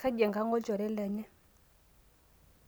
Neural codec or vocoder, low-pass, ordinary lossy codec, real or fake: none; none; none; real